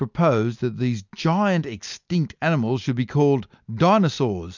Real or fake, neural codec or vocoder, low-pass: real; none; 7.2 kHz